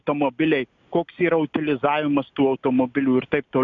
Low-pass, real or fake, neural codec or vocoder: 7.2 kHz; real; none